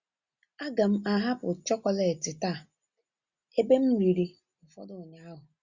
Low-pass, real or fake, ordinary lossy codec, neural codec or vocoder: 7.2 kHz; real; Opus, 64 kbps; none